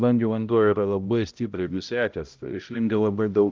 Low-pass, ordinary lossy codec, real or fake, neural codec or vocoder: 7.2 kHz; Opus, 32 kbps; fake; codec, 16 kHz, 0.5 kbps, X-Codec, HuBERT features, trained on balanced general audio